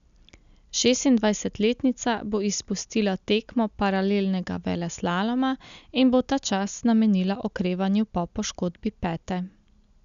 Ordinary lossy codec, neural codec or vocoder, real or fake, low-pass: none; none; real; 7.2 kHz